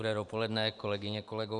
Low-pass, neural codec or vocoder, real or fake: 10.8 kHz; vocoder, 44.1 kHz, 128 mel bands every 512 samples, BigVGAN v2; fake